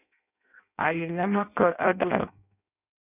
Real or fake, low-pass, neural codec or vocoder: fake; 3.6 kHz; codec, 16 kHz in and 24 kHz out, 0.6 kbps, FireRedTTS-2 codec